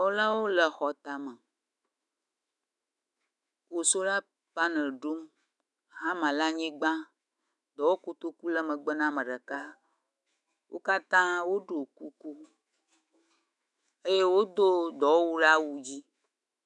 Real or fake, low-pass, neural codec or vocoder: fake; 10.8 kHz; autoencoder, 48 kHz, 128 numbers a frame, DAC-VAE, trained on Japanese speech